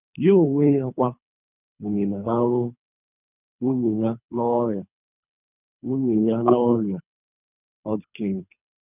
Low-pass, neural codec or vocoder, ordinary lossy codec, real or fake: 3.6 kHz; codec, 24 kHz, 3 kbps, HILCodec; none; fake